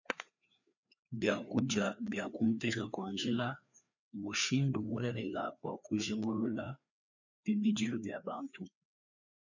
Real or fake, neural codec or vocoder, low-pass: fake; codec, 16 kHz, 2 kbps, FreqCodec, larger model; 7.2 kHz